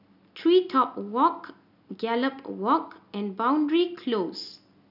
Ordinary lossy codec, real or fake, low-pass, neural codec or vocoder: none; real; 5.4 kHz; none